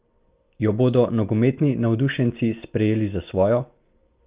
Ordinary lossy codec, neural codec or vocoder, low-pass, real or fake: Opus, 24 kbps; none; 3.6 kHz; real